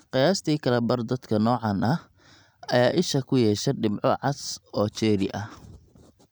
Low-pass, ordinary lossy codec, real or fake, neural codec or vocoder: none; none; real; none